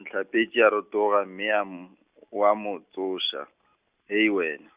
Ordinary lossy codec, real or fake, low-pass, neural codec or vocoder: Opus, 64 kbps; real; 3.6 kHz; none